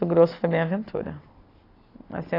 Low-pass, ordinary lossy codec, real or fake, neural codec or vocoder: 5.4 kHz; AAC, 24 kbps; real; none